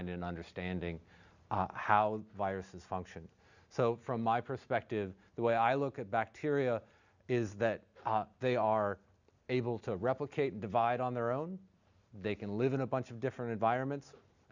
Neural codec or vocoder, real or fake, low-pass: none; real; 7.2 kHz